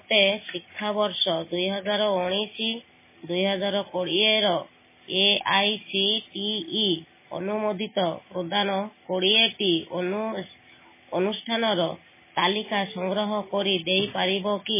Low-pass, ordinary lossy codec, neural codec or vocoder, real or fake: 3.6 kHz; MP3, 16 kbps; none; real